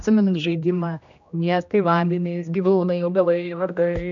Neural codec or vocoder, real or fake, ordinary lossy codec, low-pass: codec, 16 kHz, 1 kbps, X-Codec, HuBERT features, trained on general audio; fake; AAC, 64 kbps; 7.2 kHz